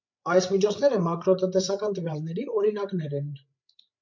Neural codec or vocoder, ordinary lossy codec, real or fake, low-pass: codec, 16 kHz, 8 kbps, FreqCodec, larger model; MP3, 48 kbps; fake; 7.2 kHz